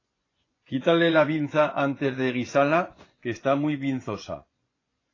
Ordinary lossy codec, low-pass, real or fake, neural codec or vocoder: AAC, 32 kbps; 7.2 kHz; fake; vocoder, 24 kHz, 100 mel bands, Vocos